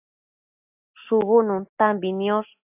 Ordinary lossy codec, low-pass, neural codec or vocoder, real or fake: AAC, 32 kbps; 3.6 kHz; none; real